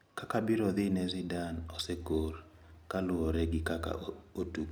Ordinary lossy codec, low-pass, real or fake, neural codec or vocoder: none; none; real; none